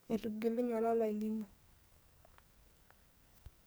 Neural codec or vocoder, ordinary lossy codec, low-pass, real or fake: codec, 44.1 kHz, 2.6 kbps, SNAC; none; none; fake